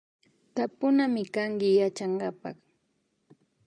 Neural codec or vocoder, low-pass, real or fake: none; 9.9 kHz; real